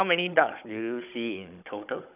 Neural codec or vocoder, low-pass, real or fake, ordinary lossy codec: codec, 16 kHz, 16 kbps, FunCodec, trained on Chinese and English, 50 frames a second; 3.6 kHz; fake; none